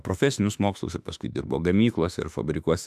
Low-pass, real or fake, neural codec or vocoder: 14.4 kHz; fake; autoencoder, 48 kHz, 32 numbers a frame, DAC-VAE, trained on Japanese speech